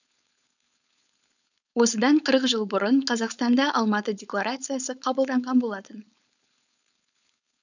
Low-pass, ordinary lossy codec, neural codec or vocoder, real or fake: 7.2 kHz; none; codec, 16 kHz, 4.8 kbps, FACodec; fake